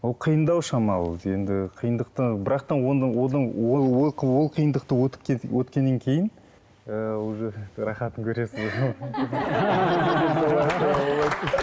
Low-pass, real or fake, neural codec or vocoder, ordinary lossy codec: none; real; none; none